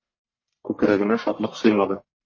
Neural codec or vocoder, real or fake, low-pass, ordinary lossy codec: codec, 44.1 kHz, 1.7 kbps, Pupu-Codec; fake; 7.2 kHz; MP3, 32 kbps